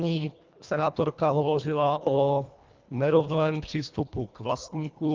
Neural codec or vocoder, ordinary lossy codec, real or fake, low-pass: codec, 24 kHz, 1.5 kbps, HILCodec; Opus, 32 kbps; fake; 7.2 kHz